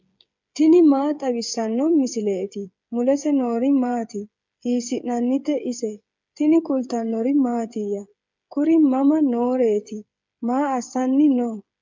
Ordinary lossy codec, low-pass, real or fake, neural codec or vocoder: MP3, 64 kbps; 7.2 kHz; fake; codec, 16 kHz, 8 kbps, FreqCodec, smaller model